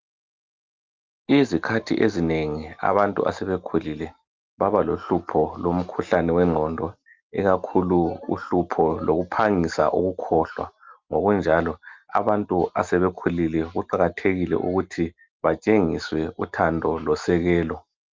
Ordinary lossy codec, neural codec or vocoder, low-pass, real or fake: Opus, 32 kbps; none; 7.2 kHz; real